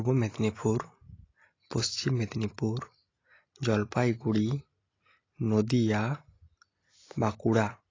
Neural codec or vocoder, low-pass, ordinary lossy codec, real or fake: none; 7.2 kHz; AAC, 32 kbps; real